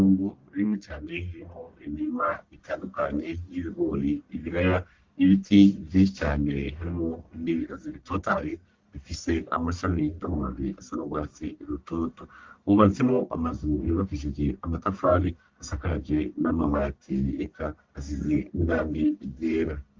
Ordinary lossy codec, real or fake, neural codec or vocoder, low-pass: Opus, 24 kbps; fake; codec, 44.1 kHz, 1.7 kbps, Pupu-Codec; 7.2 kHz